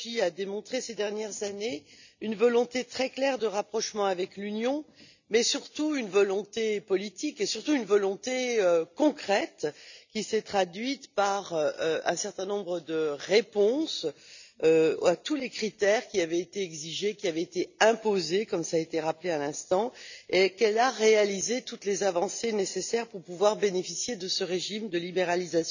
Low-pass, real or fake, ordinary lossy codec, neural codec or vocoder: 7.2 kHz; real; none; none